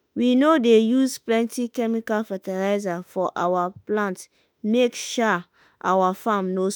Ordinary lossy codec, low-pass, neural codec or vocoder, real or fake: none; none; autoencoder, 48 kHz, 32 numbers a frame, DAC-VAE, trained on Japanese speech; fake